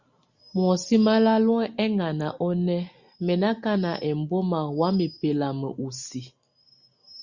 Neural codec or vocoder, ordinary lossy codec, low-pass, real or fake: none; Opus, 64 kbps; 7.2 kHz; real